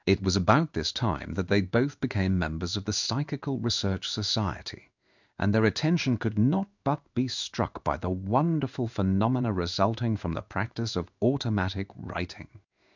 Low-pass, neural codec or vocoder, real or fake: 7.2 kHz; codec, 16 kHz in and 24 kHz out, 1 kbps, XY-Tokenizer; fake